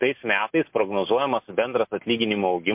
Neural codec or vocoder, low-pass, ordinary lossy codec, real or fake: none; 3.6 kHz; MP3, 32 kbps; real